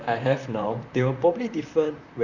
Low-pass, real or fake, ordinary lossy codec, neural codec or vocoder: 7.2 kHz; fake; none; vocoder, 44.1 kHz, 128 mel bands, Pupu-Vocoder